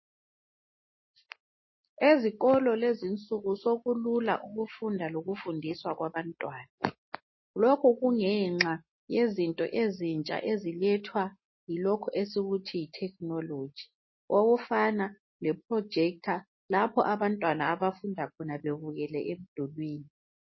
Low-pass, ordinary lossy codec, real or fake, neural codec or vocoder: 7.2 kHz; MP3, 24 kbps; real; none